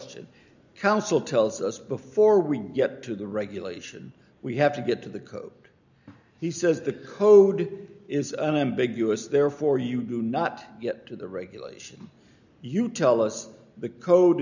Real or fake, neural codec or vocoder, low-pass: real; none; 7.2 kHz